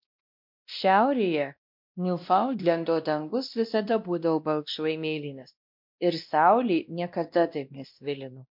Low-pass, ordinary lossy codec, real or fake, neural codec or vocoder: 5.4 kHz; MP3, 48 kbps; fake; codec, 16 kHz, 1 kbps, X-Codec, WavLM features, trained on Multilingual LibriSpeech